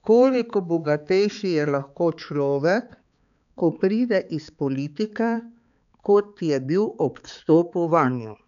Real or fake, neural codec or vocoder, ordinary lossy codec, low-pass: fake; codec, 16 kHz, 4 kbps, X-Codec, HuBERT features, trained on balanced general audio; none; 7.2 kHz